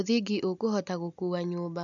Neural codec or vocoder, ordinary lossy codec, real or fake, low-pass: none; none; real; 7.2 kHz